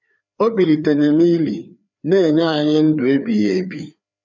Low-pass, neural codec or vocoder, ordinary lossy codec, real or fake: 7.2 kHz; codec, 16 kHz, 4 kbps, FreqCodec, larger model; none; fake